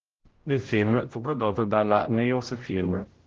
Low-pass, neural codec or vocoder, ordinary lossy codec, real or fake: 7.2 kHz; codec, 16 kHz, 0.5 kbps, X-Codec, HuBERT features, trained on general audio; Opus, 16 kbps; fake